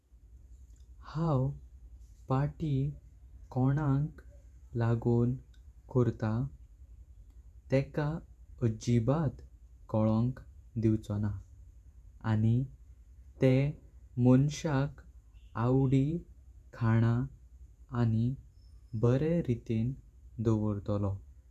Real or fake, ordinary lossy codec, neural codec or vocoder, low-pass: fake; none; vocoder, 48 kHz, 128 mel bands, Vocos; 14.4 kHz